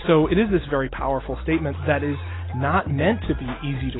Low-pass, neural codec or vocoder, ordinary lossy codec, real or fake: 7.2 kHz; none; AAC, 16 kbps; real